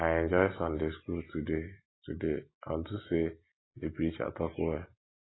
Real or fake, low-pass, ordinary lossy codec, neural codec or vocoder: real; 7.2 kHz; AAC, 16 kbps; none